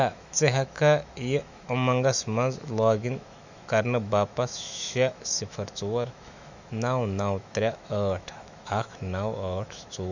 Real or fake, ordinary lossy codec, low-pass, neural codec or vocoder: fake; none; 7.2 kHz; autoencoder, 48 kHz, 128 numbers a frame, DAC-VAE, trained on Japanese speech